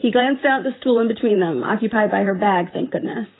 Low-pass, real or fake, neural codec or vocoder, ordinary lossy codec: 7.2 kHz; fake; vocoder, 44.1 kHz, 128 mel bands, Pupu-Vocoder; AAC, 16 kbps